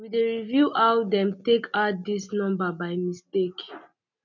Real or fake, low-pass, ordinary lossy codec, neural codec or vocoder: real; 7.2 kHz; none; none